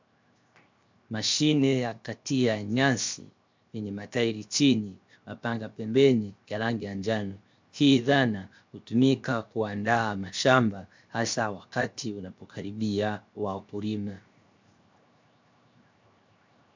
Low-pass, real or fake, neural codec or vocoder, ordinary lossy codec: 7.2 kHz; fake; codec, 16 kHz, 0.7 kbps, FocalCodec; MP3, 64 kbps